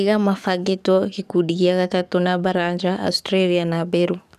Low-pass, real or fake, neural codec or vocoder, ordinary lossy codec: 14.4 kHz; fake; codec, 44.1 kHz, 7.8 kbps, DAC; none